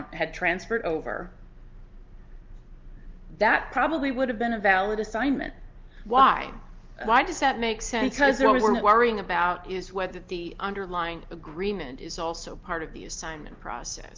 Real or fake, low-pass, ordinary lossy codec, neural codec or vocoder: real; 7.2 kHz; Opus, 32 kbps; none